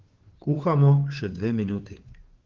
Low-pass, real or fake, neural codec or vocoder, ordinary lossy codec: 7.2 kHz; fake; codec, 16 kHz, 2 kbps, FunCodec, trained on Chinese and English, 25 frames a second; Opus, 16 kbps